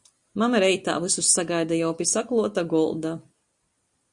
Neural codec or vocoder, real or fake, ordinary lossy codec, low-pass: none; real; Opus, 64 kbps; 10.8 kHz